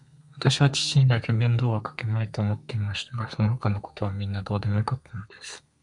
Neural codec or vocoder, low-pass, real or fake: codec, 32 kHz, 1.9 kbps, SNAC; 10.8 kHz; fake